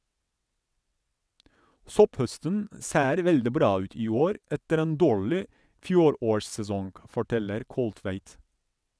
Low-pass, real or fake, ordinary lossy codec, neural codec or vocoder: none; fake; none; vocoder, 22.05 kHz, 80 mel bands, WaveNeXt